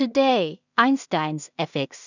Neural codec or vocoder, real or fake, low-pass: codec, 16 kHz in and 24 kHz out, 0.4 kbps, LongCat-Audio-Codec, two codebook decoder; fake; 7.2 kHz